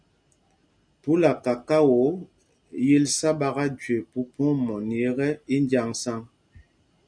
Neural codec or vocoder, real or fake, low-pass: none; real; 9.9 kHz